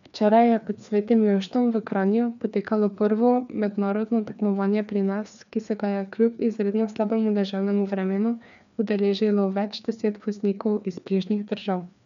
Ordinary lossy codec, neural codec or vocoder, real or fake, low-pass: none; codec, 16 kHz, 2 kbps, FreqCodec, larger model; fake; 7.2 kHz